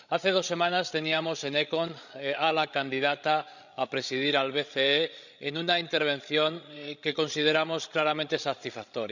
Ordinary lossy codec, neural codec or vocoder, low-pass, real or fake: none; codec, 16 kHz, 16 kbps, FreqCodec, larger model; 7.2 kHz; fake